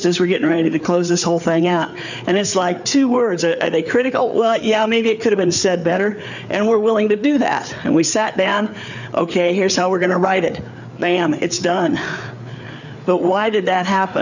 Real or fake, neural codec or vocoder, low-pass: fake; codec, 16 kHz, 4 kbps, FreqCodec, larger model; 7.2 kHz